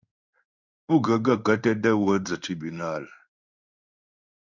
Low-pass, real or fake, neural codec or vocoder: 7.2 kHz; fake; codec, 16 kHz in and 24 kHz out, 1 kbps, XY-Tokenizer